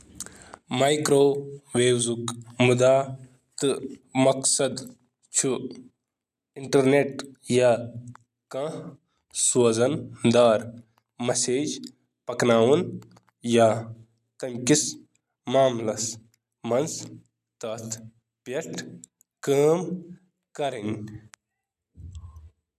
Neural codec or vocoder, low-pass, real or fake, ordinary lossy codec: none; 14.4 kHz; real; none